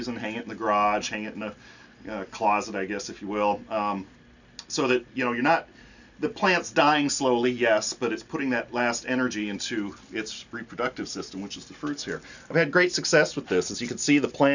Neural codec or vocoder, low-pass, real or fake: none; 7.2 kHz; real